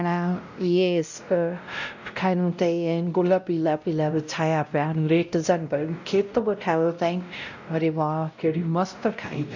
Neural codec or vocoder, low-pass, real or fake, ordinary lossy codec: codec, 16 kHz, 0.5 kbps, X-Codec, WavLM features, trained on Multilingual LibriSpeech; 7.2 kHz; fake; none